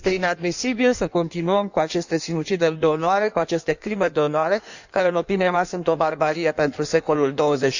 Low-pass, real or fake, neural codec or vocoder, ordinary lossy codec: 7.2 kHz; fake; codec, 16 kHz in and 24 kHz out, 1.1 kbps, FireRedTTS-2 codec; none